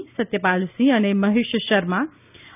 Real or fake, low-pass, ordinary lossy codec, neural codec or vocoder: real; 3.6 kHz; none; none